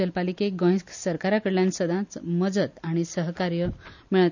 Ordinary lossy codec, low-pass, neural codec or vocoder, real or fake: none; 7.2 kHz; none; real